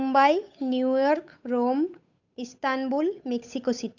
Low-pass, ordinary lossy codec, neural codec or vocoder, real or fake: 7.2 kHz; none; codec, 16 kHz, 8 kbps, FunCodec, trained on Chinese and English, 25 frames a second; fake